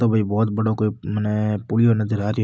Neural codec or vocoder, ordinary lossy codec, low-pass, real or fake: none; none; none; real